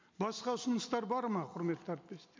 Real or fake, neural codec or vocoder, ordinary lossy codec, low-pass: real; none; MP3, 64 kbps; 7.2 kHz